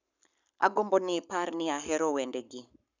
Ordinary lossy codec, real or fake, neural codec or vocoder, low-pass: none; fake; codec, 44.1 kHz, 7.8 kbps, Pupu-Codec; 7.2 kHz